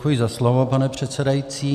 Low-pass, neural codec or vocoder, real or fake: 14.4 kHz; none; real